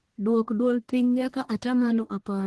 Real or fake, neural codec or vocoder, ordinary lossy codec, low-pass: fake; codec, 44.1 kHz, 1.7 kbps, Pupu-Codec; Opus, 16 kbps; 10.8 kHz